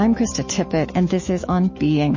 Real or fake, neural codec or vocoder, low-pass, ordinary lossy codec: fake; autoencoder, 48 kHz, 128 numbers a frame, DAC-VAE, trained on Japanese speech; 7.2 kHz; MP3, 32 kbps